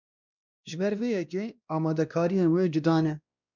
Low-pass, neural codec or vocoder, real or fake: 7.2 kHz; codec, 16 kHz, 1 kbps, X-Codec, WavLM features, trained on Multilingual LibriSpeech; fake